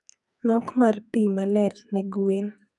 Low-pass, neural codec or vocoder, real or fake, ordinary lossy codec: 10.8 kHz; codec, 44.1 kHz, 2.6 kbps, SNAC; fake; none